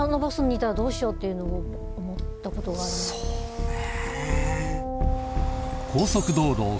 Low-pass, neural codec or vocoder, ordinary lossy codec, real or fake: none; none; none; real